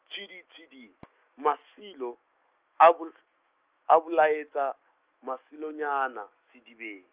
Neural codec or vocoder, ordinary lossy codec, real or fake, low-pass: autoencoder, 48 kHz, 128 numbers a frame, DAC-VAE, trained on Japanese speech; Opus, 24 kbps; fake; 3.6 kHz